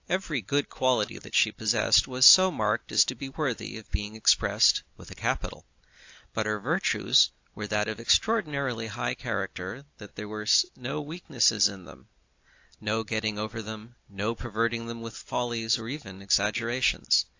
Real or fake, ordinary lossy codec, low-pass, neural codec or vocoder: real; AAC, 48 kbps; 7.2 kHz; none